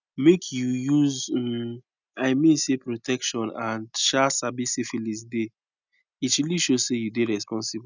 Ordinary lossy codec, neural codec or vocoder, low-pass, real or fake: none; none; 7.2 kHz; real